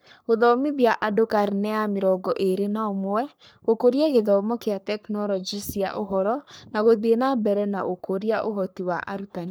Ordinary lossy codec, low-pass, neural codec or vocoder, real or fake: none; none; codec, 44.1 kHz, 3.4 kbps, Pupu-Codec; fake